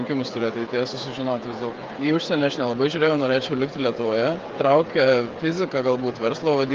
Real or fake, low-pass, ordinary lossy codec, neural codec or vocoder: fake; 7.2 kHz; Opus, 32 kbps; codec, 16 kHz, 8 kbps, FreqCodec, smaller model